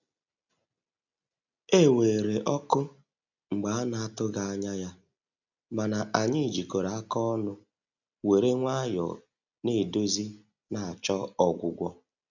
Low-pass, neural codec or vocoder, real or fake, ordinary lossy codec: 7.2 kHz; none; real; none